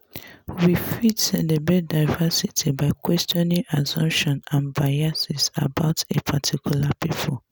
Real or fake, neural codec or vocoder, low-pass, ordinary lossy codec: real; none; none; none